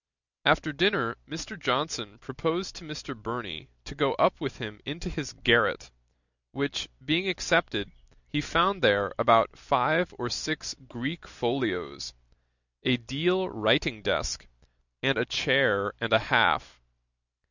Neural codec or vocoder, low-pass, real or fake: none; 7.2 kHz; real